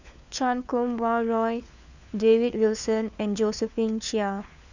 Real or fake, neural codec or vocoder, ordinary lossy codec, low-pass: fake; codec, 16 kHz, 4 kbps, FunCodec, trained on LibriTTS, 50 frames a second; none; 7.2 kHz